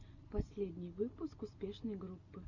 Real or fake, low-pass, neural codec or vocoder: real; 7.2 kHz; none